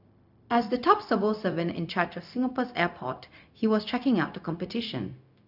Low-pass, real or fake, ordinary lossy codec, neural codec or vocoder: 5.4 kHz; fake; none; codec, 16 kHz, 0.4 kbps, LongCat-Audio-Codec